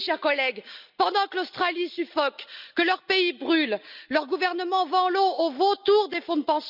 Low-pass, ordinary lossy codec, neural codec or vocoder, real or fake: 5.4 kHz; none; none; real